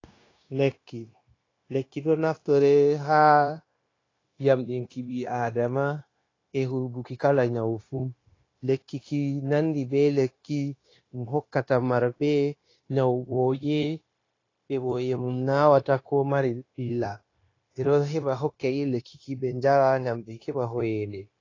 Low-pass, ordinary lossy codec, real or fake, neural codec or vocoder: 7.2 kHz; AAC, 32 kbps; fake; codec, 16 kHz, 0.9 kbps, LongCat-Audio-Codec